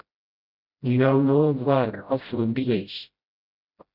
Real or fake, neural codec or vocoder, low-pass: fake; codec, 16 kHz, 0.5 kbps, FreqCodec, smaller model; 5.4 kHz